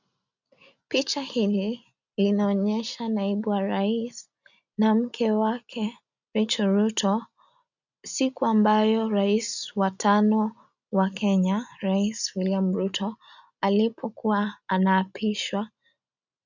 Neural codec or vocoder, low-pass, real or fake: none; 7.2 kHz; real